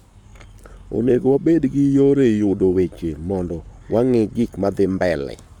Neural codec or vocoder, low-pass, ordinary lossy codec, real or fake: codec, 44.1 kHz, 7.8 kbps, Pupu-Codec; 19.8 kHz; none; fake